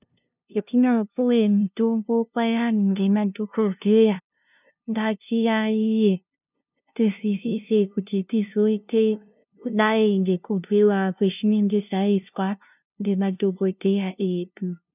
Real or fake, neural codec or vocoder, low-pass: fake; codec, 16 kHz, 0.5 kbps, FunCodec, trained on LibriTTS, 25 frames a second; 3.6 kHz